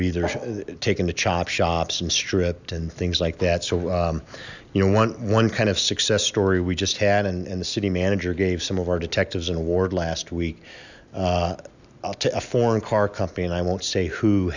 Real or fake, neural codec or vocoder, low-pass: real; none; 7.2 kHz